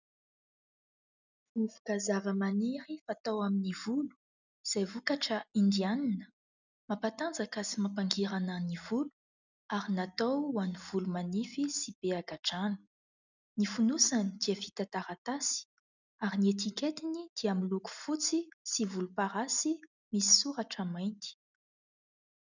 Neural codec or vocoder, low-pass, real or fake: none; 7.2 kHz; real